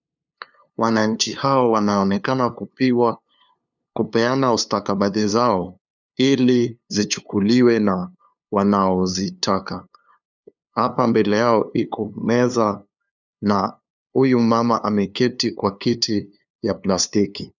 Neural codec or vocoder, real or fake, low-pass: codec, 16 kHz, 2 kbps, FunCodec, trained on LibriTTS, 25 frames a second; fake; 7.2 kHz